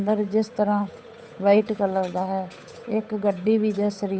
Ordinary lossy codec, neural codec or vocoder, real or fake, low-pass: none; codec, 16 kHz, 8 kbps, FunCodec, trained on Chinese and English, 25 frames a second; fake; none